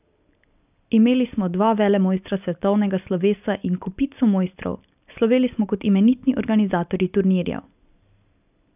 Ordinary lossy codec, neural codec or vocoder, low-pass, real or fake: none; none; 3.6 kHz; real